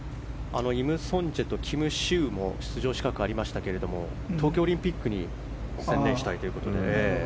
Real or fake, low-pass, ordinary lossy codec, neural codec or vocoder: real; none; none; none